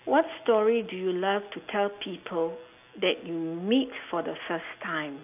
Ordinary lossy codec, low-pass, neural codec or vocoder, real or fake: AAC, 32 kbps; 3.6 kHz; none; real